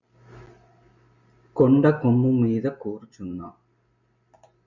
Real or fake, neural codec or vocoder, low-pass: real; none; 7.2 kHz